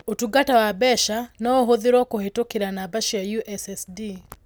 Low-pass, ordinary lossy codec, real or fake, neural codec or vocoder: none; none; real; none